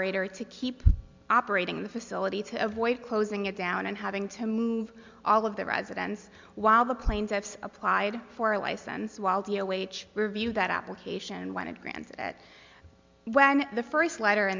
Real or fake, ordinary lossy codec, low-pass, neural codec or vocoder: real; MP3, 64 kbps; 7.2 kHz; none